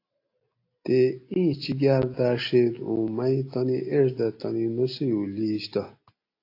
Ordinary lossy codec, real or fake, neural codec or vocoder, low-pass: AAC, 32 kbps; real; none; 5.4 kHz